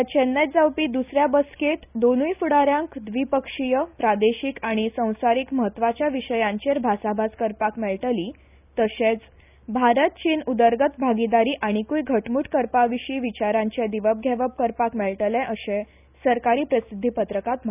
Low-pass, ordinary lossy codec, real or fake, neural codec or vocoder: 3.6 kHz; none; real; none